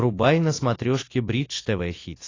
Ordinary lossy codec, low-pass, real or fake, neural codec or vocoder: AAC, 32 kbps; 7.2 kHz; real; none